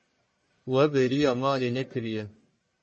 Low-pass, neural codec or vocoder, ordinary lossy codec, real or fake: 10.8 kHz; codec, 44.1 kHz, 1.7 kbps, Pupu-Codec; MP3, 32 kbps; fake